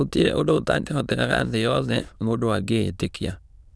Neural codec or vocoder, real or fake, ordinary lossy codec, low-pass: autoencoder, 22.05 kHz, a latent of 192 numbers a frame, VITS, trained on many speakers; fake; none; none